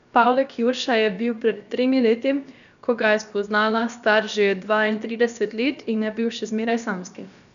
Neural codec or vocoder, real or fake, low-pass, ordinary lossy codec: codec, 16 kHz, about 1 kbps, DyCAST, with the encoder's durations; fake; 7.2 kHz; none